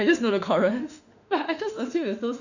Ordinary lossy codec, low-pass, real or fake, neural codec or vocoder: none; 7.2 kHz; fake; autoencoder, 48 kHz, 32 numbers a frame, DAC-VAE, trained on Japanese speech